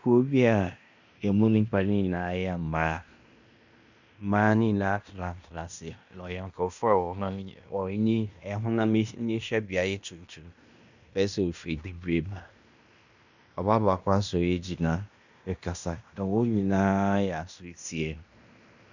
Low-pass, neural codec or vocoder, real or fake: 7.2 kHz; codec, 16 kHz in and 24 kHz out, 0.9 kbps, LongCat-Audio-Codec, fine tuned four codebook decoder; fake